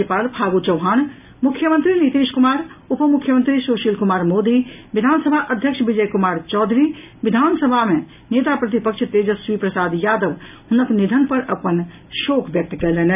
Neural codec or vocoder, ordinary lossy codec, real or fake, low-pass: none; none; real; 3.6 kHz